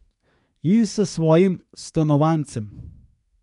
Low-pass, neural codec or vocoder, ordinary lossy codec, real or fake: 10.8 kHz; codec, 24 kHz, 1 kbps, SNAC; none; fake